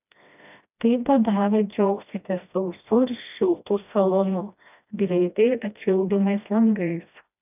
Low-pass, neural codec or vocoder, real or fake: 3.6 kHz; codec, 16 kHz, 1 kbps, FreqCodec, smaller model; fake